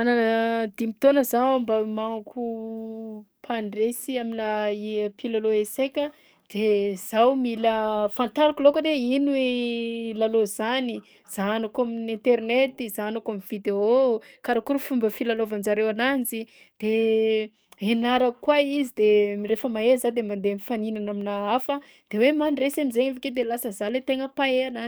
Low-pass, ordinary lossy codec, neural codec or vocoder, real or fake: none; none; codec, 44.1 kHz, 7.8 kbps, DAC; fake